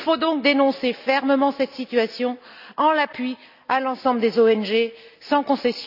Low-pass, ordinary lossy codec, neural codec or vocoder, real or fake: 5.4 kHz; none; none; real